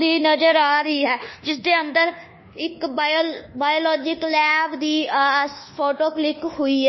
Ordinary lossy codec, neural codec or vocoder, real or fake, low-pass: MP3, 24 kbps; codec, 24 kHz, 1.2 kbps, DualCodec; fake; 7.2 kHz